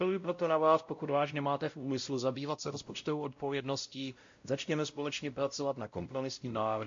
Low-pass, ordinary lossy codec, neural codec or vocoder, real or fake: 7.2 kHz; MP3, 48 kbps; codec, 16 kHz, 0.5 kbps, X-Codec, WavLM features, trained on Multilingual LibriSpeech; fake